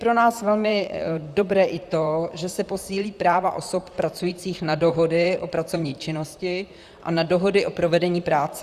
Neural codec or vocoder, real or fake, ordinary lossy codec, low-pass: vocoder, 44.1 kHz, 128 mel bands, Pupu-Vocoder; fake; Opus, 64 kbps; 14.4 kHz